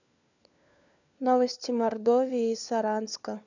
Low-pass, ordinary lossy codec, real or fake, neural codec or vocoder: 7.2 kHz; none; fake; codec, 16 kHz, 4 kbps, FunCodec, trained on LibriTTS, 50 frames a second